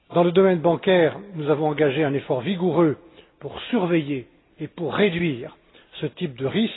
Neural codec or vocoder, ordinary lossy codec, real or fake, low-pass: none; AAC, 16 kbps; real; 7.2 kHz